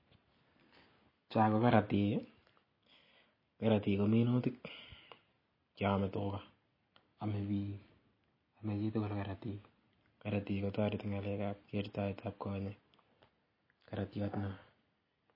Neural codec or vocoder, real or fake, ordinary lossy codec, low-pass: none; real; MP3, 24 kbps; 5.4 kHz